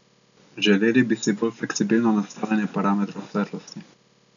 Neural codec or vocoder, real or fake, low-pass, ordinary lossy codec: none; real; 7.2 kHz; none